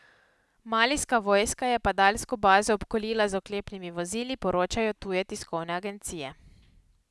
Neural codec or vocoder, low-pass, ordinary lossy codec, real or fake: none; none; none; real